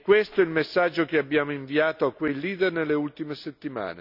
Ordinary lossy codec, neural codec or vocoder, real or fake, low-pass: none; none; real; 5.4 kHz